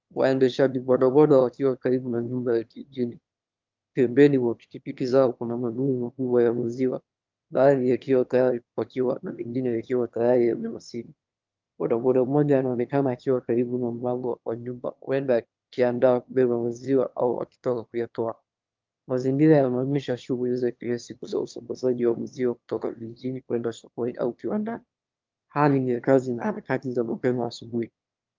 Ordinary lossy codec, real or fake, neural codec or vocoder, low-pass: Opus, 24 kbps; fake; autoencoder, 22.05 kHz, a latent of 192 numbers a frame, VITS, trained on one speaker; 7.2 kHz